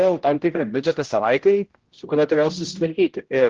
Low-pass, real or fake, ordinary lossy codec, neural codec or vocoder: 7.2 kHz; fake; Opus, 16 kbps; codec, 16 kHz, 0.5 kbps, X-Codec, HuBERT features, trained on general audio